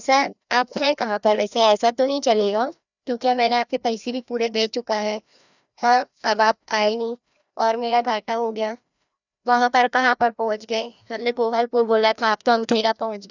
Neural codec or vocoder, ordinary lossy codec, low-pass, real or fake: codec, 16 kHz, 1 kbps, FreqCodec, larger model; none; 7.2 kHz; fake